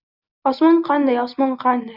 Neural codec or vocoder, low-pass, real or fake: none; 5.4 kHz; real